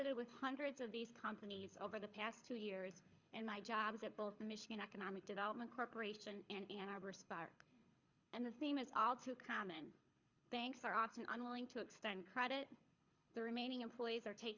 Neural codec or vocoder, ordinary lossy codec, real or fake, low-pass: codec, 24 kHz, 6 kbps, HILCodec; Opus, 64 kbps; fake; 7.2 kHz